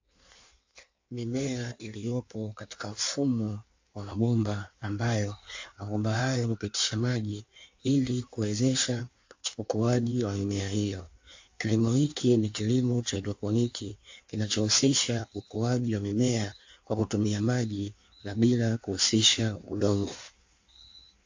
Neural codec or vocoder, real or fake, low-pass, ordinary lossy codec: codec, 16 kHz in and 24 kHz out, 1.1 kbps, FireRedTTS-2 codec; fake; 7.2 kHz; AAC, 48 kbps